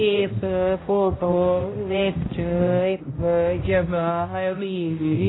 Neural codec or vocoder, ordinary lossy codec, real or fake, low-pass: codec, 16 kHz, 0.5 kbps, X-Codec, HuBERT features, trained on balanced general audio; AAC, 16 kbps; fake; 7.2 kHz